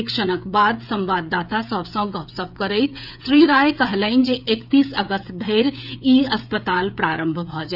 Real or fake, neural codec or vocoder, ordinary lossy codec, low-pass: fake; codec, 16 kHz, 16 kbps, FreqCodec, smaller model; none; 5.4 kHz